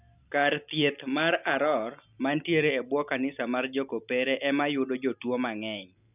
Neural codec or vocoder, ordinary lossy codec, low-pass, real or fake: none; none; 3.6 kHz; real